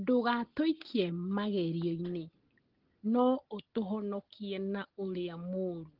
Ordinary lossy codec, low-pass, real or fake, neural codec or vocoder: Opus, 16 kbps; 5.4 kHz; real; none